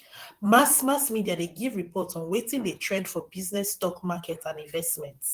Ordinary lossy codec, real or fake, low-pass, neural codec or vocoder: Opus, 24 kbps; fake; 14.4 kHz; vocoder, 44.1 kHz, 128 mel bands, Pupu-Vocoder